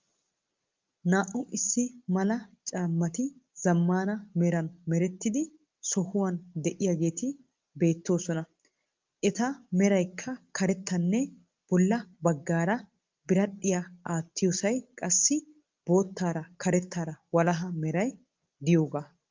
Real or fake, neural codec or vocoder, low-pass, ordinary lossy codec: real; none; 7.2 kHz; Opus, 32 kbps